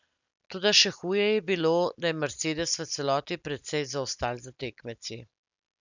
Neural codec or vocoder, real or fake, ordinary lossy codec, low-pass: none; real; none; 7.2 kHz